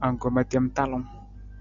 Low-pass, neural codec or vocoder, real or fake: 7.2 kHz; none; real